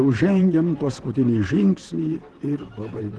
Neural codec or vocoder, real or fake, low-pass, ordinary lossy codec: vocoder, 44.1 kHz, 128 mel bands, Pupu-Vocoder; fake; 10.8 kHz; Opus, 16 kbps